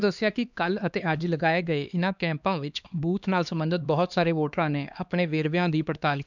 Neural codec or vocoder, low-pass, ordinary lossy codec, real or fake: codec, 16 kHz, 2 kbps, X-Codec, HuBERT features, trained on LibriSpeech; 7.2 kHz; none; fake